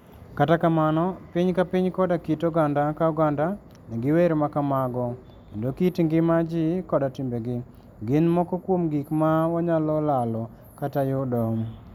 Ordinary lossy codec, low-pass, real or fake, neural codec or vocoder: none; 19.8 kHz; real; none